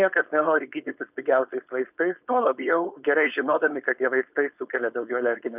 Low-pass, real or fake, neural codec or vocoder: 3.6 kHz; fake; codec, 16 kHz, 4.8 kbps, FACodec